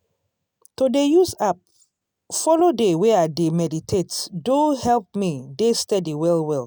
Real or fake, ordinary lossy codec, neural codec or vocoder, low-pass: real; none; none; none